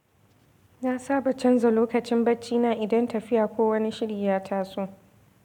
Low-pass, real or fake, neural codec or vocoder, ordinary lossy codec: 19.8 kHz; real; none; none